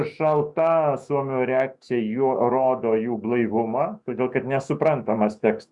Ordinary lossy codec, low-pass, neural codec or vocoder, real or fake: Opus, 64 kbps; 10.8 kHz; autoencoder, 48 kHz, 128 numbers a frame, DAC-VAE, trained on Japanese speech; fake